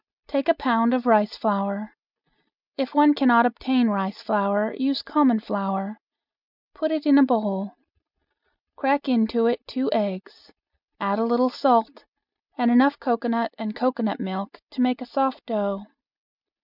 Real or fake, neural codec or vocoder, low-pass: real; none; 5.4 kHz